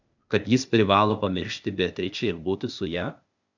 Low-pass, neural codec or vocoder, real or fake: 7.2 kHz; codec, 16 kHz, 0.8 kbps, ZipCodec; fake